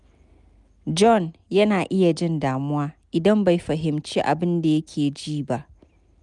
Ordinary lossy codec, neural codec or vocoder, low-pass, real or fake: none; none; 10.8 kHz; real